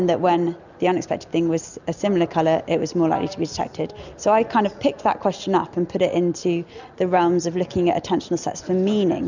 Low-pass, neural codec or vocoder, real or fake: 7.2 kHz; none; real